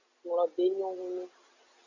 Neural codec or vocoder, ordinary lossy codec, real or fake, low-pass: none; Opus, 64 kbps; real; 7.2 kHz